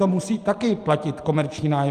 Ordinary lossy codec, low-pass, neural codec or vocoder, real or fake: Opus, 32 kbps; 14.4 kHz; vocoder, 44.1 kHz, 128 mel bands every 512 samples, BigVGAN v2; fake